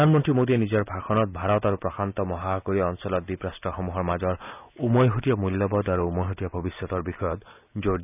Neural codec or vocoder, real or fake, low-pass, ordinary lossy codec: none; real; 3.6 kHz; none